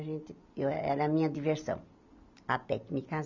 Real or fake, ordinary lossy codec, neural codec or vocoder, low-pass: real; none; none; 7.2 kHz